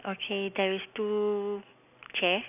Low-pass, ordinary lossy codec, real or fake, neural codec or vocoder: 3.6 kHz; none; real; none